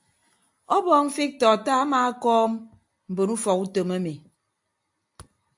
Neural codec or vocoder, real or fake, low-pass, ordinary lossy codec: none; real; 10.8 kHz; AAC, 64 kbps